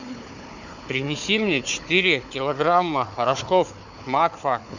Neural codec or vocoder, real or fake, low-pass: codec, 16 kHz, 4 kbps, FunCodec, trained on Chinese and English, 50 frames a second; fake; 7.2 kHz